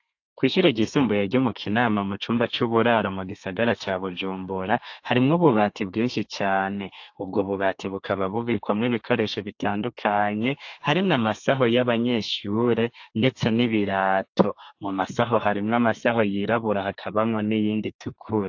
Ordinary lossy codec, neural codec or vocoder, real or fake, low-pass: AAC, 48 kbps; codec, 32 kHz, 1.9 kbps, SNAC; fake; 7.2 kHz